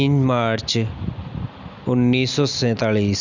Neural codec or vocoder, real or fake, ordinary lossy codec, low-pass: none; real; none; 7.2 kHz